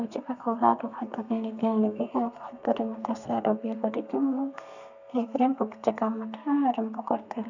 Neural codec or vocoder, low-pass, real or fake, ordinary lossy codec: codec, 32 kHz, 1.9 kbps, SNAC; 7.2 kHz; fake; none